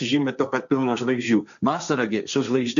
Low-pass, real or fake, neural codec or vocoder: 7.2 kHz; fake; codec, 16 kHz, 1.1 kbps, Voila-Tokenizer